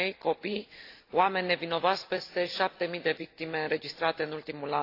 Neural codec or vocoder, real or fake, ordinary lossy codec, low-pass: none; real; AAC, 32 kbps; 5.4 kHz